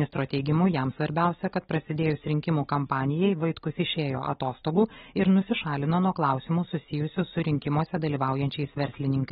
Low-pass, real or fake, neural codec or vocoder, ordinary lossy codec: 7.2 kHz; real; none; AAC, 16 kbps